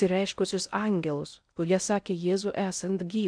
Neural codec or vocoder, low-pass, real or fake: codec, 16 kHz in and 24 kHz out, 0.6 kbps, FocalCodec, streaming, 4096 codes; 9.9 kHz; fake